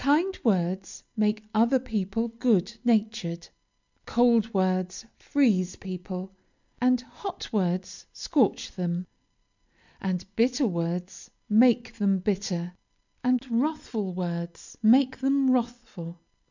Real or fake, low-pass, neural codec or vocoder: real; 7.2 kHz; none